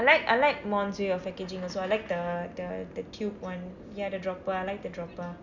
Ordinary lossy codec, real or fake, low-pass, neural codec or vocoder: none; real; 7.2 kHz; none